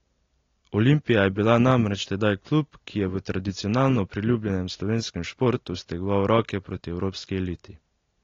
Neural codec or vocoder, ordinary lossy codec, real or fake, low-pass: none; AAC, 32 kbps; real; 7.2 kHz